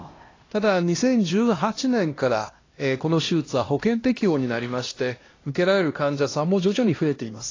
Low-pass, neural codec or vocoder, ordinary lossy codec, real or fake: 7.2 kHz; codec, 16 kHz, 1 kbps, X-Codec, HuBERT features, trained on LibriSpeech; AAC, 32 kbps; fake